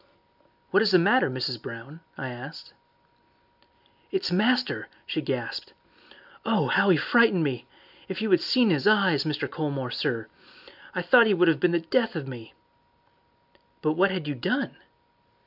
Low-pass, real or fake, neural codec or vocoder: 5.4 kHz; real; none